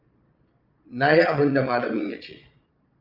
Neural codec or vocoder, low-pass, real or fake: vocoder, 22.05 kHz, 80 mel bands, Vocos; 5.4 kHz; fake